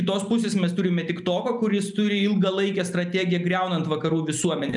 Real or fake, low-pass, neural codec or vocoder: real; 10.8 kHz; none